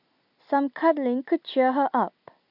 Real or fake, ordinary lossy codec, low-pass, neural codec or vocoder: real; none; 5.4 kHz; none